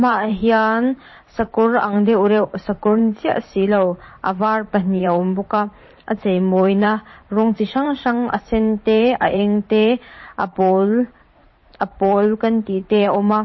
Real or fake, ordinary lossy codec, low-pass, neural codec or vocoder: fake; MP3, 24 kbps; 7.2 kHz; vocoder, 44.1 kHz, 128 mel bands every 512 samples, BigVGAN v2